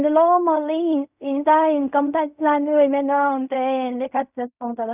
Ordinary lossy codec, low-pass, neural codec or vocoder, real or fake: none; 3.6 kHz; codec, 16 kHz in and 24 kHz out, 0.4 kbps, LongCat-Audio-Codec, fine tuned four codebook decoder; fake